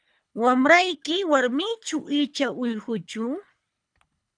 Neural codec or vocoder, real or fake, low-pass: codec, 24 kHz, 3 kbps, HILCodec; fake; 9.9 kHz